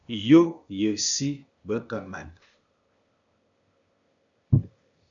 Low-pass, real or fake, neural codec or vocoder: 7.2 kHz; fake; codec, 16 kHz, 0.8 kbps, ZipCodec